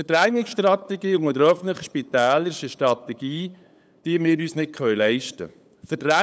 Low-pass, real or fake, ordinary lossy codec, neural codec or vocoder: none; fake; none; codec, 16 kHz, 8 kbps, FunCodec, trained on LibriTTS, 25 frames a second